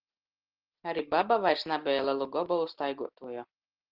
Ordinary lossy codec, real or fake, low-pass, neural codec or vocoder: Opus, 16 kbps; real; 5.4 kHz; none